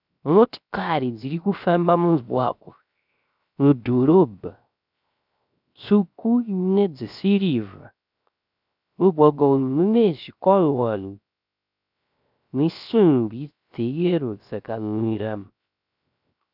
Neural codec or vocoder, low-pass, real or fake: codec, 16 kHz, 0.3 kbps, FocalCodec; 5.4 kHz; fake